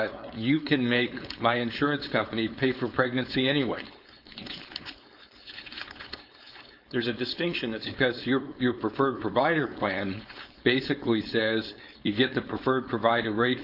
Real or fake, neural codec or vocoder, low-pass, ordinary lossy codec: fake; codec, 16 kHz, 4.8 kbps, FACodec; 5.4 kHz; AAC, 48 kbps